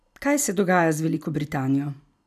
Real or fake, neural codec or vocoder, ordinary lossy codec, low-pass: real; none; none; 14.4 kHz